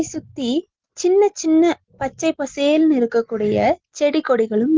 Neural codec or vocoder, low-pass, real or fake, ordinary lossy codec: none; 7.2 kHz; real; Opus, 16 kbps